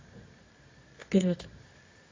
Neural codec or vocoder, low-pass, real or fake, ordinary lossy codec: codec, 24 kHz, 0.9 kbps, WavTokenizer, medium music audio release; 7.2 kHz; fake; AAC, 32 kbps